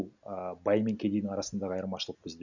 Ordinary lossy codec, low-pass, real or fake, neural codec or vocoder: none; 7.2 kHz; real; none